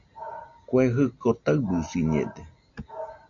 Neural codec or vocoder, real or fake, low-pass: none; real; 7.2 kHz